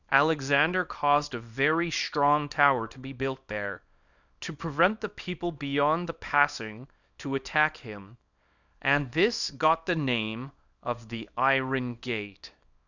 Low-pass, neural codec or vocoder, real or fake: 7.2 kHz; codec, 24 kHz, 0.9 kbps, WavTokenizer, small release; fake